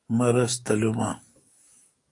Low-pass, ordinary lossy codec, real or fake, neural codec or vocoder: 10.8 kHz; AAC, 48 kbps; fake; codec, 44.1 kHz, 7.8 kbps, DAC